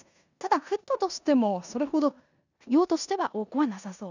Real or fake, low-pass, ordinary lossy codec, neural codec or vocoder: fake; 7.2 kHz; none; codec, 16 kHz in and 24 kHz out, 0.9 kbps, LongCat-Audio-Codec, four codebook decoder